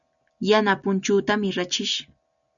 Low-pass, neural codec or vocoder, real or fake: 7.2 kHz; none; real